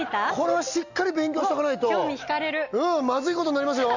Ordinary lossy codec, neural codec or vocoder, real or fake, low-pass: none; none; real; 7.2 kHz